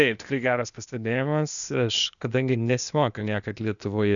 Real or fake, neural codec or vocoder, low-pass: fake; codec, 16 kHz, 0.8 kbps, ZipCodec; 7.2 kHz